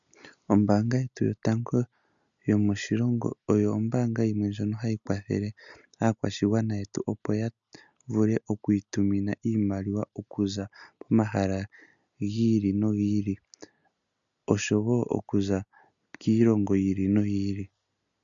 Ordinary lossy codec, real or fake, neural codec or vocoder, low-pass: MP3, 96 kbps; real; none; 7.2 kHz